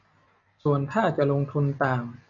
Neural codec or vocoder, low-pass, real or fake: none; 7.2 kHz; real